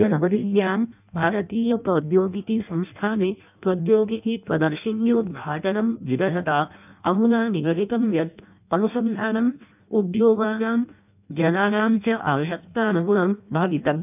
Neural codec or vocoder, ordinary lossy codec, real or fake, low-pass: codec, 16 kHz in and 24 kHz out, 0.6 kbps, FireRedTTS-2 codec; none; fake; 3.6 kHz